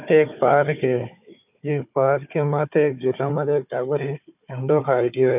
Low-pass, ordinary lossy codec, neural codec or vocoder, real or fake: 3.6 kHz; none; codec, 16 kHz, 4 kbps, FunCodec, trained on Chinese and English, 50 frames a second; fake